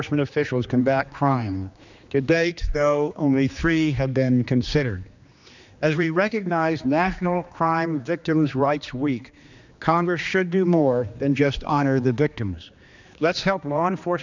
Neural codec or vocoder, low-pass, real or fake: codec, 16 kHz, 2 kbps, X-Codec, HuBERT features, trained on general audio; 7.2 kHz; fake